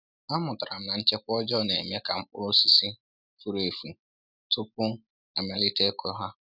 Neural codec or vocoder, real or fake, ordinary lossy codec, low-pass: none; real; none; 5.4 kHz